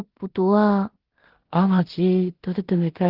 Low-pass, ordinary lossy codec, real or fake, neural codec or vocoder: 5.4 kHz; Opus, 16 kbps; fake; codec, 16 kHz in and 24 kHz out, 0.4 kbps, LongCat-Audio-Codec, two codebook decoder